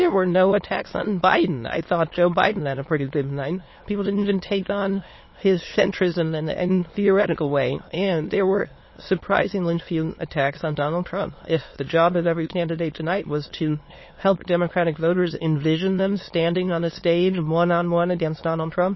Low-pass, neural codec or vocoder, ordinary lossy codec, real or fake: 7.2 kHz; autoencoder, 22.05 kHz, a latent of 192 numbers a frame, VITS, trained on many speakers; MP3, 24 kbps; fake